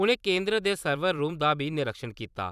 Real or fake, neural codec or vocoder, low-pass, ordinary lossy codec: fake; vocoder, 44.1 kHz, 128 mel bands every 256 samples, BigVGAN v2; 14.4 kHz; none